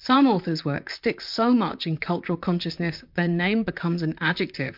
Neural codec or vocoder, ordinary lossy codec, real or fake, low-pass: vocoder, 44.1 kHz, 128 mel bands, Pupu-Vocoder; MP3, 48 kbps; fake; 5.4 kHz